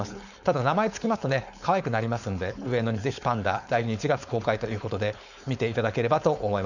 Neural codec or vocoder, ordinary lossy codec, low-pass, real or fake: codec, 16 kHz, 4.8 kbps, FACodec; none; 7.2 kHz; fake